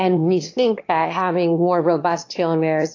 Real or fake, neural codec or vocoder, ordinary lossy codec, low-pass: fake; autoencoder, 22.05 kHz, a latent of 192 numbers a frame, VITS, trained on one speaker; AAC, 48 kbps; 7.2 kHz